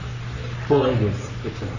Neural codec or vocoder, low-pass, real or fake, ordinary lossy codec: codec, 44.1 kHz, 3.4 kbps, Pupu-Codec; 7.2 kHz; fake; none